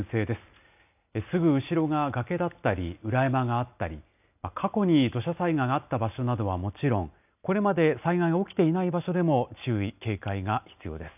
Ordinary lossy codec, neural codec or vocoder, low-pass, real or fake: none; none; 3.6 kHz; real